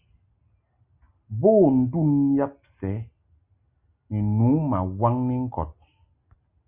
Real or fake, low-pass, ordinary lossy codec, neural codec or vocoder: real; 3.6 kHz; Opus, 64 kbps; none